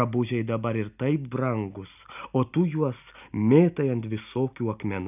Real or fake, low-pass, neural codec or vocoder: real; 3.6 kHz; none